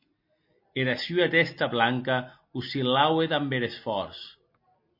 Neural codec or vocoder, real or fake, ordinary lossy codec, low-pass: none; real; MP3, 32 kbps; 5.4 kHz